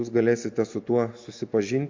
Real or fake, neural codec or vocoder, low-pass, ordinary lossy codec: real; none; 7.2 kHz; MP3, 48 kbps